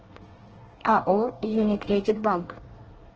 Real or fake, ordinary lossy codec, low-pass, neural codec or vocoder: fake; Opus, 16 kbps; 7.2 kHz; codec, 24 kHz, 1 kbps, SNAC